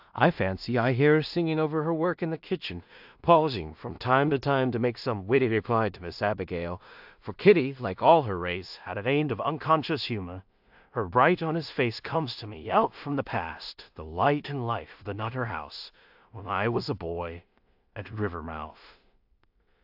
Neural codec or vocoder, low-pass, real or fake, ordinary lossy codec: codec, 16 kHz in and 24 kHz out, 0.4 kbps, LongCat-Audio-Codec, two codebook decoder; 5.4 kHz; fake; AAC, 48 kbps